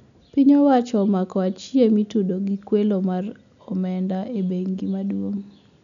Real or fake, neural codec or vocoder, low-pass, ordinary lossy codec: real; none; 7.2 kHz; none